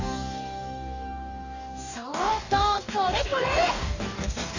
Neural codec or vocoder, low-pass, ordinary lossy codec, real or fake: codec, 44.1 kHz, 2.6 kbps, DAC; 7.2 kHz; none; fake